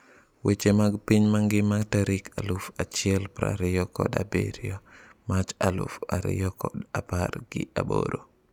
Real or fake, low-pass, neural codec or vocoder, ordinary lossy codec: real; 19.8 kHz; none; none